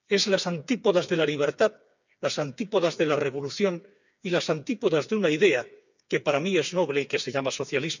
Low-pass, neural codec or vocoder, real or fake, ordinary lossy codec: 7.2 kHz; codec, 16 kHz, 4 kbps, FreqCodec, smaller model; fake; none